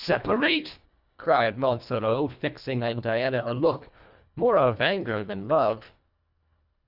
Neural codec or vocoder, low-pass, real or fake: codec, 24 kHz, 1.5 kbps, HILCodec; 5.4 kHz; fake